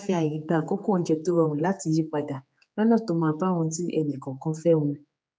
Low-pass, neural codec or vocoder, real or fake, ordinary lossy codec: none; codec, 16 kHz, 4 kbps, X-Codec, HuBERT features, trained on general audio; fake; none